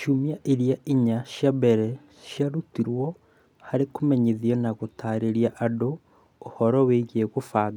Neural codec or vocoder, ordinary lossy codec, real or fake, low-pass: none; none; real; 19.8 kHz